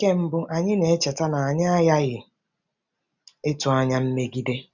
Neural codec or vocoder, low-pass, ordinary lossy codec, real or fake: none; 7.2 kHz; none; real